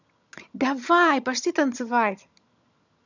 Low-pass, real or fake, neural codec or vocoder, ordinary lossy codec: 7.2 kHz; fake; vocoder, 22.05 kHz, 80 mel bands, HiFi-GAN; none